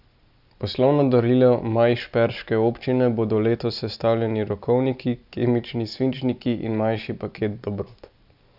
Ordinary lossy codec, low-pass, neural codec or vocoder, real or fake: none; 5.4 kHz; none; real